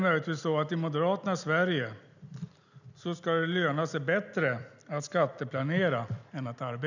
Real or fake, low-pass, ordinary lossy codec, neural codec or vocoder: real; 7.2 kHz; none; none